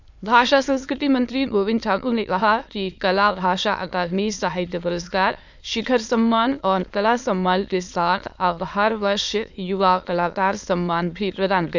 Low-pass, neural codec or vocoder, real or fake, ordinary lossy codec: 7.2 kHz; autoencoder, 22.05 kHz, a latent of 192 numbers a frame, VITS, trained on many speakers; fake; none